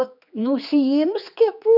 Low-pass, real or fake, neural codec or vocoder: 5.4 kHz; fake; codec, 16 kHz, 4 kbps, X-Codec, HuBERT features, trained on general audio